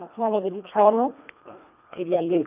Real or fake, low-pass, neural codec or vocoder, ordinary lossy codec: fake; 3.6 kHz; codec, 24 kHz, 1.5 kbps, HILCodec; none